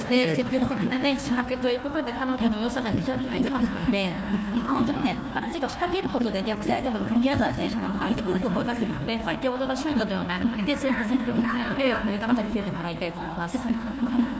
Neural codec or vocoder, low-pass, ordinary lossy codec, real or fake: codec, 16 kHz, 1 kbps, FunCodec, trained on Chinese and English, 50 frames a second; none; none; fake